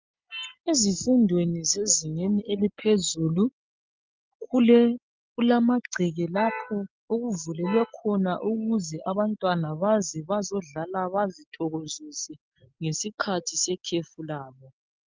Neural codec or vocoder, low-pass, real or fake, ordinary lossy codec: none; 7.2 kHz; real; Opus, 32 kbps